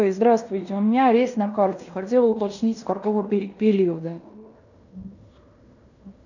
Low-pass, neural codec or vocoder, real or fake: 7.2 kHz; codec, 16 kHz in and 24 kHz out, 0.9 kbps, LongCat-Audio-Codec, fine tuned four codebook decoder; fake